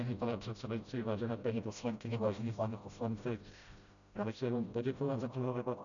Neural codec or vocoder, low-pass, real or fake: codec, 16 kHz, 0.5 kbps, FreqCodec, smaller model; 7.2 kHz; fake